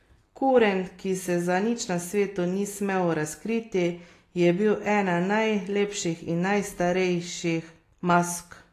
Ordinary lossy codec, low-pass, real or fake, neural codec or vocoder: AAC, 48 kbps; 14.4 kHz; real; none